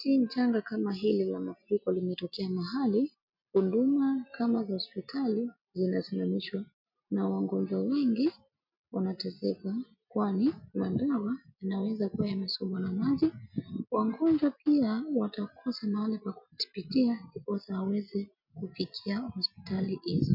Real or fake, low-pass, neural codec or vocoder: real; 5.4 kHz; none